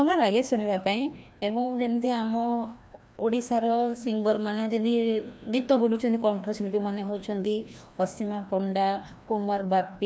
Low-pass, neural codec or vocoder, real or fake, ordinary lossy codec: none; codec, 16 kHz, 1 kbps, FreqCodec, larger model; fake; none